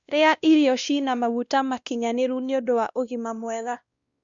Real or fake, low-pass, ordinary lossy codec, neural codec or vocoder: fake; 7.2 kHz; Opus, 64 kbps; codec, 16 kHz, 1 kbps, X-Codec, WavLM features, trained on Multilingual LibriSpeech